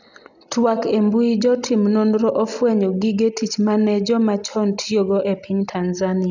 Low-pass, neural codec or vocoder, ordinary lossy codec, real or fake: 7.2 kHz; none; none; real